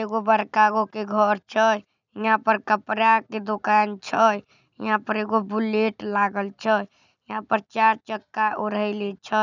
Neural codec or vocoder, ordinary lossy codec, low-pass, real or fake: none; none; 7.2 kHz; real